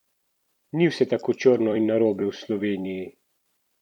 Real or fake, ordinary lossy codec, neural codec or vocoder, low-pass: fake; none; vocoder, 44.1 kHz, 128 mel bands every 256 samples, BigVGAN v2; 19.8 kHz